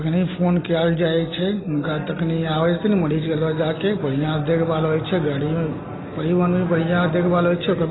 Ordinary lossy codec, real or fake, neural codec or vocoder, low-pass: AAC, 16 kbps; real; none; 7.2 kHz